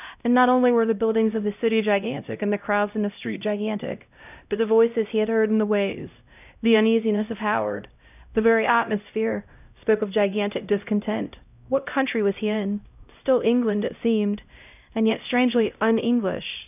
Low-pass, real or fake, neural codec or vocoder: 3.6 kHz; fake; codec, 16 kHz, 0.5 kbps, X-Codec, HuBERT features, trained on LibriSpeech